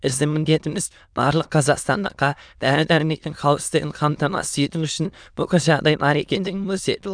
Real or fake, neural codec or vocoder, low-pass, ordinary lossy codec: fake; autoencoder, 22.05 kHz, a latent of 192 numbers a frame, VITS, trained on many speakers; 9.9 kHz; none